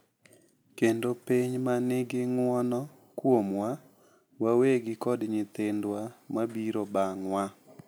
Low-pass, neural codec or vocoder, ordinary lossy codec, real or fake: none; none; none; real